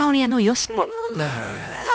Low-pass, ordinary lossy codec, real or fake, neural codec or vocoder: none; none; fake; codec, 16 kHz, 1 kbps, X-Codec, HuBERT features, trained on LibriSpeech